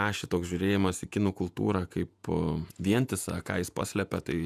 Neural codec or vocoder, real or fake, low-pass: vocoder, 48 kHz, 128 mel bands, Vocos; fake; 14.4 kHz